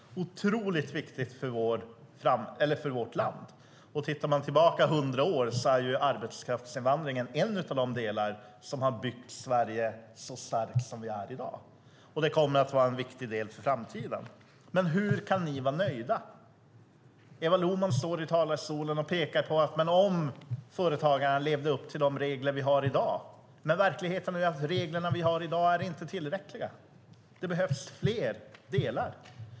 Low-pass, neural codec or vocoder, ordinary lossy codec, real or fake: none; none; none; real